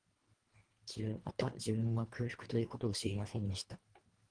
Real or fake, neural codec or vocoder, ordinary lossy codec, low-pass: fake; codec, 24 kHz, 1.5 kbps, HILCodec; Opus, 24 kbps; 9.9 kHz